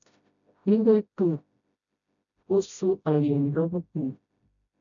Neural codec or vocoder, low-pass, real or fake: codec, 16 kHz, 0.5 kbps, FreqCodec, smaller model; 7.2 kHz; fake